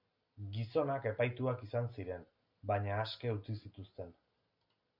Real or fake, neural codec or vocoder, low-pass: real; none; 5.4 kHz